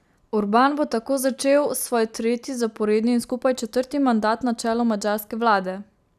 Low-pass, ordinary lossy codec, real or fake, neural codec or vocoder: 14.4 kHz; none; real; none